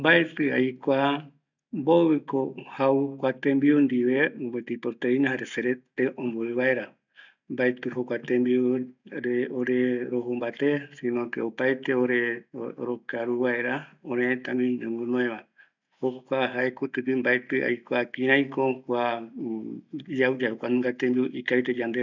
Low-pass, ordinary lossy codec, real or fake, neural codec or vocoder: 7.2 kHz; none; real; none